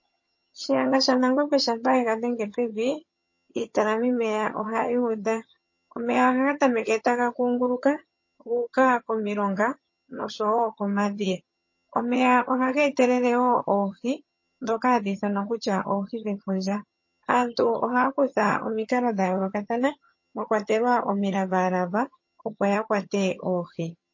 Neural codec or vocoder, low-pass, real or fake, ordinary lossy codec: vocoder, 22.05 kHz, 80 mel bands, HiFi-GAN; 7.2 kHz; fake; MP3, 32 kbps